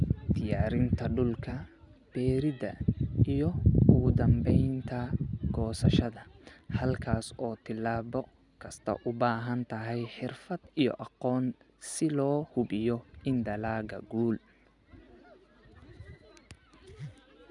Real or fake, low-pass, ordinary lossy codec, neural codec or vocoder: real; 10.8 kHz; none; none